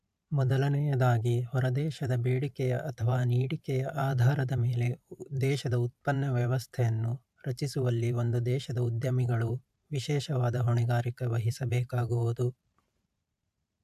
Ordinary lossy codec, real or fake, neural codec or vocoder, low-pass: AAC, 96 kbps; fake; vocoder, 44.1 kHz, 128 mel bands every 512 samples, BigVGAN v2; 14.4 kHz